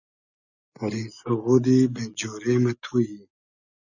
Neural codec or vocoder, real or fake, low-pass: none; real; 7.2 kHz